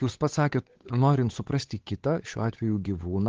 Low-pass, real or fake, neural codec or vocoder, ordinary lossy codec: 7.2 kHz; fake; codec, 16 kHz, 8 kbps, FunCodec, trained on Chinese and English, 25 frames a second; Opus, 16 kbps